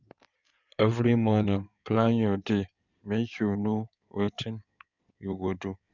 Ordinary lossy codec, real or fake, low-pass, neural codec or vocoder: none; fake; 7.2 kHz; codec, 16 kHz in and 24 kHz out, 2.2 kbps, FireRedTTS-2 codec